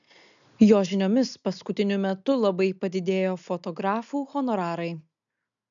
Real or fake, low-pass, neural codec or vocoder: real; 7.2 kHz; none